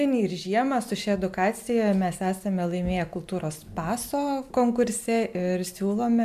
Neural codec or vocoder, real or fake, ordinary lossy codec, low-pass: none; real; MP3, 96 kbps; 14.4 kHz